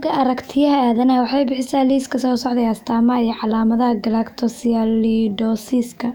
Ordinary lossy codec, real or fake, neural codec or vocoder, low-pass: none; fake; autoencoder, 48 kHz, 128 numbers a frame, DAC-VAE, trained on Japanese speech; 19.8 kHz